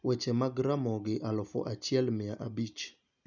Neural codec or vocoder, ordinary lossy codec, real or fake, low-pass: none; none; real; 7.2 kHz